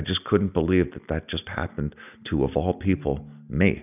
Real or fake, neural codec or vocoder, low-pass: real; none; 3.6 kHz